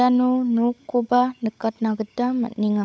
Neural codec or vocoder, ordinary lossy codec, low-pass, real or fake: codec, 16 kHz, 16 kbps, FunCodec, trained on LibriTTS, 50 frames a second; none; none; fake